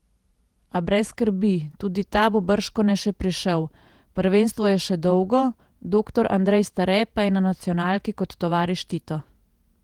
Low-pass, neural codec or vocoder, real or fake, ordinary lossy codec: 19.8 kHz; vocoder, 48 kHz, 128 mel bands, Vocos; fake; Opus, 24 kbps